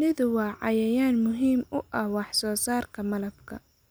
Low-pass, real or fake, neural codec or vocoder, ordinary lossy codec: none; real; none; none